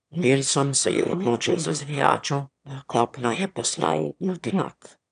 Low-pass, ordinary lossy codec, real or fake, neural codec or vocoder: 9.9 kHz; none; fake; autoencoder, 22.05 kHz, a latent of 192 numbers a frame, VITS, trained on one speaker